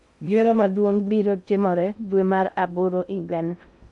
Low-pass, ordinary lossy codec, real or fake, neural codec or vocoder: 10.8 kHz; none; fake; codec, 16 kHz in and 24 kHz out, 0.6 kbps, FocalCodec, streaming, 2048 codes